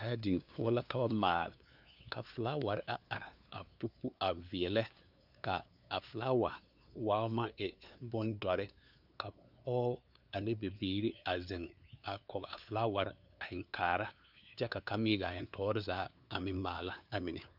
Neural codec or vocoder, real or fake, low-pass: codec, 16 kHz, 2 kbps, FunCodec, trained on LibriTTS, 25 frames a second; fake; 5.4 kHz